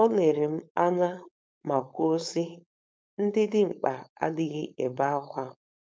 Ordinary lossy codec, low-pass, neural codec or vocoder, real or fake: none; none; codec, 16 kHz, 4.8 kbps, FACodec; fake